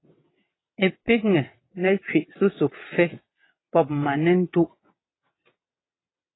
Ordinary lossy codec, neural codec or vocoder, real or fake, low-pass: AAC, 16 kbps; vocoder, 22.05 kHz, 80 mel bands, WaveNeXt; fake; 7.2 kHz